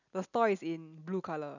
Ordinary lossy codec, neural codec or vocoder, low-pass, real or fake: none; none; 7.2 kHz; real